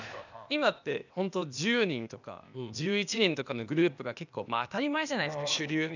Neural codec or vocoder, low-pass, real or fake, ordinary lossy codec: codec, 16 kHz, 0.8 kbps, ZipCodec; 7.2 kHz; fake; none